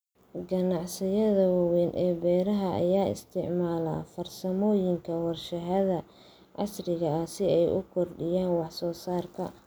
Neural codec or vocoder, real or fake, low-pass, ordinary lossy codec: none; real; none; none